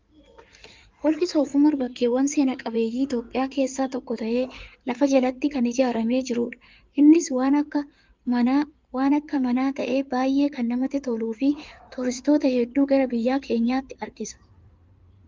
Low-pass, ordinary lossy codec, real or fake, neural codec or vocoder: 7.2 kHz; Opus, 24 kbps; fake; codec, 16 kHz in and 24 kHz out, 2.2 kbps, FireRedTTS-2 codec